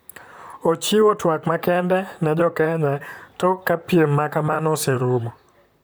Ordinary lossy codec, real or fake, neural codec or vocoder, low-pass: none; fake; vocoder, 44.1 kHz, 128 mel bands, Pupu-Vocoder; none